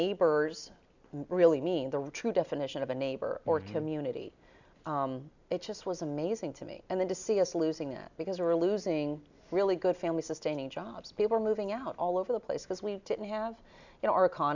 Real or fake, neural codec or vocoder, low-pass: real; none; 7.2 kHz